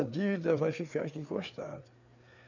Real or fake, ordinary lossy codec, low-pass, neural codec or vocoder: fake; none; 7.2 kHz; codec, 16 kHz, 4 kbps, FreqCodec, larger model